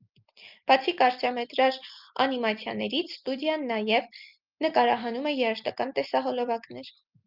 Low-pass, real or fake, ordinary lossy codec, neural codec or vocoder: 5.4 kHz; real; Opus, 32 kbps; none